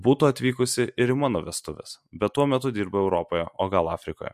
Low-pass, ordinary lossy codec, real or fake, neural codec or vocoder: 14.4 kHz; MP3, 64 kbps; real; none